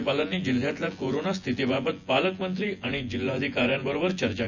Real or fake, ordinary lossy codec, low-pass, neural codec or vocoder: fake; none; 7.2 kHz; vocoder, 24 kHz, 100 mel bands, Vocos